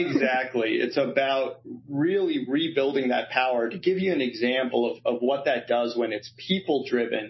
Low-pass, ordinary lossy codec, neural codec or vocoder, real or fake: 7.2 kHz; MP3, 24 kbps; none; real